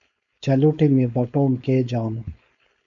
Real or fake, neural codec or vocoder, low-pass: fake; codec, 16 kHz, 4.8 kbps, FACodec; 7.2 kHz